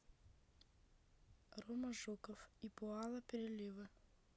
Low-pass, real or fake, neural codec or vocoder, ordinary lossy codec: none; real; none; none